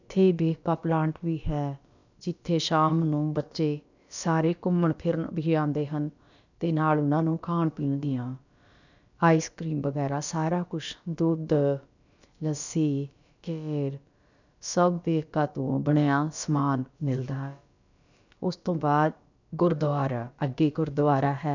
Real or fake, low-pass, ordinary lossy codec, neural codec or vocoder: fake; 7.2 kHz; none; codec, 16 kHz, about 1 kbps, DyCAST, with the encoder's durations